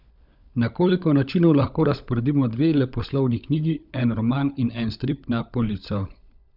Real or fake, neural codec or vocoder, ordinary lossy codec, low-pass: fake; codec, 16 kHz, 16 kbps, FunCodec, trained on LibriTTS, 50 frames a second; none; 5.4 kHz